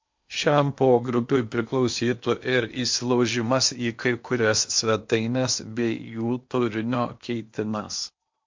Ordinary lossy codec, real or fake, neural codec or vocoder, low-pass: MP3, 48 kbps; fake; codec, 16 kHz in and 24 kHz out, 0.8 kbps, FocalCodec, streaming, 65536 codes; 7.2 kHz